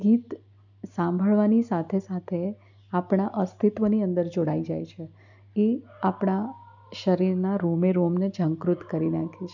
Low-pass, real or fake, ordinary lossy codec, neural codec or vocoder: 7.2 kHz; real; none; none